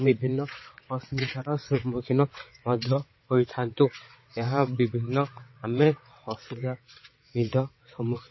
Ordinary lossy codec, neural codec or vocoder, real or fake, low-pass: MP3, 24 kbps; vocoder, 22.05 kHz, 80 mel bands, WaveNeXt; fake; 7.2 kHz